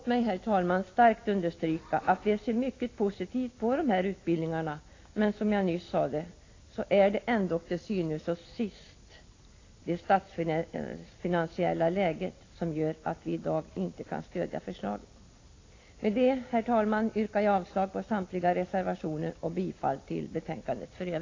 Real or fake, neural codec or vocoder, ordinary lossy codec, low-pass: real; none; AAC, 32 kbps; 7.2 kHz